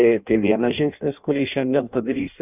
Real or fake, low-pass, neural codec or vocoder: fake; 3.6 kHz; codec, 16 kHz in and 24 kHz out, 0.6 kbps, FireRedTTS-2 codec